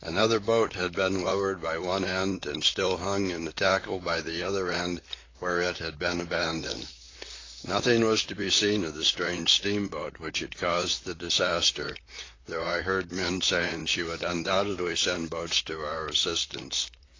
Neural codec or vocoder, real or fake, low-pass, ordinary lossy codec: vocoder, 44.1 kHz, 128 mel bands, Pupu-Vocoder; fake; 7.2 kHz; AAC, 32 kbps